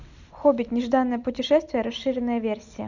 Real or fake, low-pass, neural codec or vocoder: real; 7.2 kHz; none